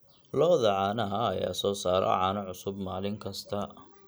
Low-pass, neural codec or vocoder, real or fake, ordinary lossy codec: none; none; real; none